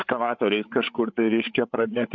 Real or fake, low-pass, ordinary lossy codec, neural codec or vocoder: fake; 7.2 kHz; MP3, 64 kbps; codec, 16 kHz, 8 kbps, FreqCodec, larger model